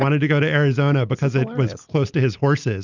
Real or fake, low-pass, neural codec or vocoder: real; 7.2 kHz; none